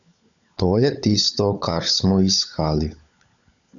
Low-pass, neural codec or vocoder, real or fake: 7.2 kHz; codec, 16 kHz, 16 kbps, FunCodec, trained on LibriTTS, 50 frames a second; fake